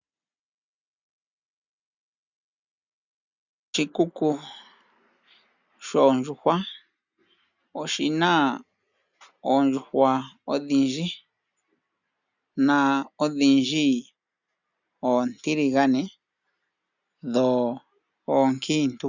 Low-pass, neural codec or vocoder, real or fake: 7.2 kHz; none; real